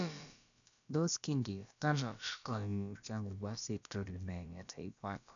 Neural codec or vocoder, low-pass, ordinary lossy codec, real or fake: codec, 16 kHz, about 1 kbps, DyCAST, with the encoder's durations; 7.2 kHz; none; fake